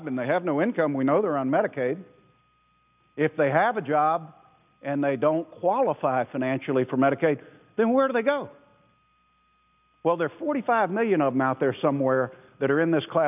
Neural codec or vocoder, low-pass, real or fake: none; 3.6 kHz; real